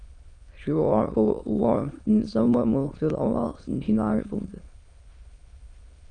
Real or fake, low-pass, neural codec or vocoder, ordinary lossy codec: fake; 9.9 kHz; autoencoder, 22.05 kHz, a latent of 192 numbers a frame, VITS, trained on many speakers; Opus, 32 kbps